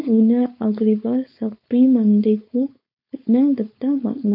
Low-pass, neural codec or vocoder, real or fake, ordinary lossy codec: 5.4 kHz; codec, 16 kHz, 4.8 kbps, FACodec; fake; MP3, 32 kbps